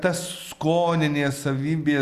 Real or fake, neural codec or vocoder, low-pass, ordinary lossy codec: real; none; 14.4 kHz; Opus, 64 kbps